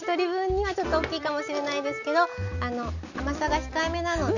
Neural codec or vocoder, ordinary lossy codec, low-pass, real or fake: none; none; 7.2 kHz; real